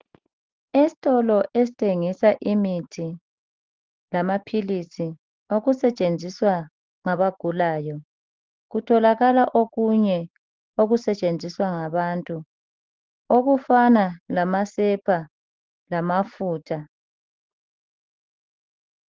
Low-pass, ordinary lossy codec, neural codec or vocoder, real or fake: 7.2 kHz; Opus, 16 kbps; none; real